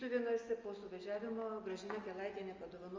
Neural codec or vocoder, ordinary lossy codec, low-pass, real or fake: none; Opus, 32 kbps; 7.2 kHz; real